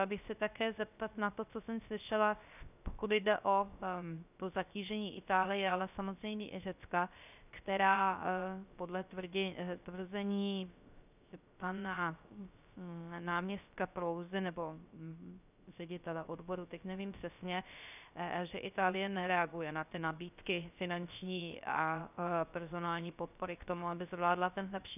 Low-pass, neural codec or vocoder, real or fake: 3.6 kHz; codec, 16 kHz, 0.3 kbps, FocalCodec; fake